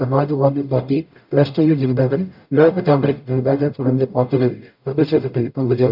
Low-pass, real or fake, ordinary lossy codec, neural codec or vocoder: 5.4 kHz; fake; none; codec, 44.1 kHz, 0.9 kbps, DAC